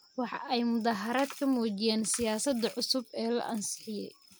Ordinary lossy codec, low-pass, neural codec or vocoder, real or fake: none; none; none; real